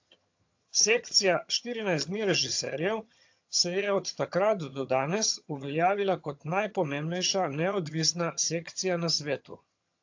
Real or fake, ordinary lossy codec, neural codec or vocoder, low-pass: fake; AAC, 48 kbps; vocoder, 22.05 kHz, 80 mel bands, HiFi-GAN; 7.2 kHz